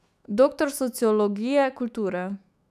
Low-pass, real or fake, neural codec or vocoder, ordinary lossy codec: 14.4 kHz; fake; autoencoder, 48 kHz, 128 numbers a frame, DAC-VAE, trained on Japanese speech; none